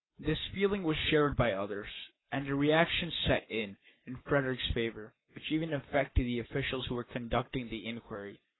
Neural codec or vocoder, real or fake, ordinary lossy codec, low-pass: codec, 44.1 kHz, 7.8 kbps, Pupu-Codec; fake; AAC, 16 kbps; 7.2 kHz